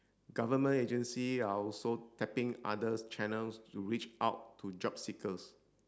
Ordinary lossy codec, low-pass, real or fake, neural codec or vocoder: none; none; real; none